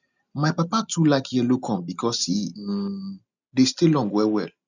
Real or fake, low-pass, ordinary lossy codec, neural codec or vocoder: real; 7.2 kHz; none; none